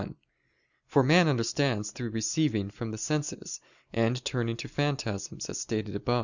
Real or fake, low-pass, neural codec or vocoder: real; 7.2 kHz; none